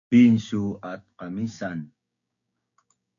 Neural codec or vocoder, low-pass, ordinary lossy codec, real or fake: codec, 16 kHz, 6 kbps, DAC; 7.2 kHz; MP3, 96 kbps; fake